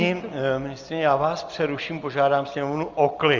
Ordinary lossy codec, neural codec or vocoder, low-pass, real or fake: Opus, 32 kbps; none; 7.2 kHz; real